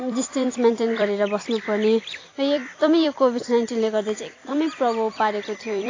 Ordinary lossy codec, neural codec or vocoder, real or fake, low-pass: AAC, 32 kbps; vocoder, 44.1 kHz, 128 mel bands every 256 samples, BigVGAN v2; fake; 7.2 kHz